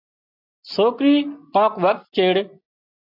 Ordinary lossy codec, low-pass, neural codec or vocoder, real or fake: AAC, 48 kbps; 5.4 kHz; none; real